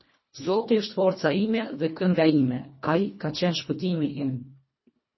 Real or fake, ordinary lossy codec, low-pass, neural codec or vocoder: fake; MP3, 24 kbps; 7.2 kHz; codec, 24 kHz, 1.5 kbps, HILCodec